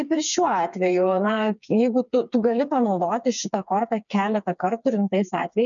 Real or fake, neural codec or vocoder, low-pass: fake; codec, 16 kHz, 4 kbps, FreqCodec, smaller model; 7.2 kHz